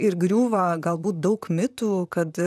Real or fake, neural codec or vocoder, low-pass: fake; vocoder, 44.1 kHz, 128 mel bands, Pupu-Vocoder; 14.4 kHz